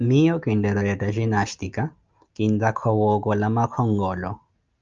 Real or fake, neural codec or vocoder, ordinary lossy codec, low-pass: real; none; Opus, 24 kbps; 7.2 kHz